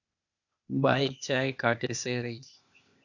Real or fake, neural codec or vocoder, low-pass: fake; codec, 16 kHz, 0.8 kbps, ZipCodec; 7.2 kHz